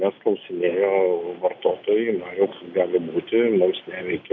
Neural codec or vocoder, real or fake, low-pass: none; real; 7.2 kHz